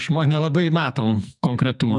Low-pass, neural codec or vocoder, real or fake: 10.8 kHz; codec, 44.1 kHz, 2.6 kbps, SNAC; fake